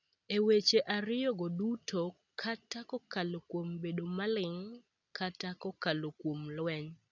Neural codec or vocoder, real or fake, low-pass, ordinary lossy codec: none; real; 7.2 kHz; none